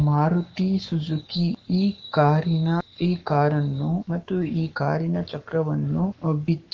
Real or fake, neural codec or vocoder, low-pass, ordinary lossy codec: fake; codec, 44.1 kHz, 7.8 kbps, Pupu-Codec; 7.2 kHz; Opus, 32 kbps